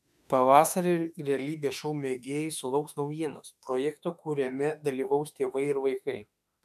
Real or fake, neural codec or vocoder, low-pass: fake; autoencoder, 48 kHz, 32 numbers a frame, DAC-VAE, trained on Japanese speech; 14.4 kHz